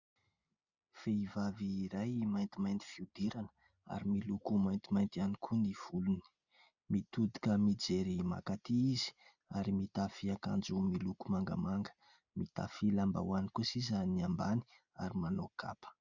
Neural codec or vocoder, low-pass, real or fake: none; 7.2 kHz; real